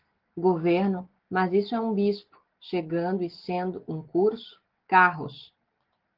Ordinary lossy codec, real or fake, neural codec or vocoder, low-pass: Opus, 16 kbps; real; none; 5.4 kHz